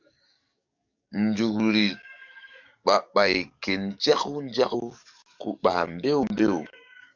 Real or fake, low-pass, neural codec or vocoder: fake; 7.2 kHz; codec, 44.1 kHz, 7.8 kbps, DAC